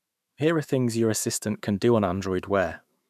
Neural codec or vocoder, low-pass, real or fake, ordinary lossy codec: autoencoder, 48 kHz, 128 numbers a frame, DAC-VAE, trained on Japanese speech; 14.4 kHz; fake; none